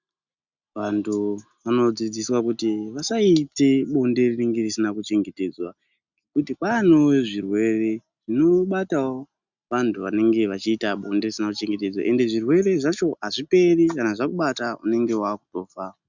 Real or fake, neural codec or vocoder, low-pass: real; none; 7.2 kHz